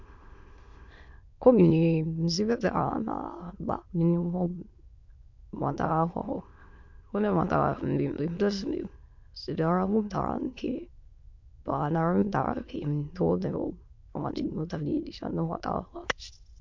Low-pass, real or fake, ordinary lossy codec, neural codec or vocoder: 7.2 kHz; fake; MP3, 48 kbps; autoencoder, 22.05 kHz, a latent of 192 numbers a frame, VITS, trained on many speakers